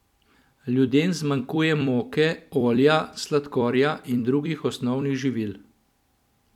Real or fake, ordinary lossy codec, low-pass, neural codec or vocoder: fake; none; 19.8 kHz; vocoder, 44.1 kHz, 128 mel bands every 256 samples, BigVGAN v2